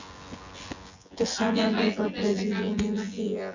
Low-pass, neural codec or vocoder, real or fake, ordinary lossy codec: 7.2 kHz; vocoder, 24 kHz, 100 mel bands, Vocos; fake; Opus, 64 kbps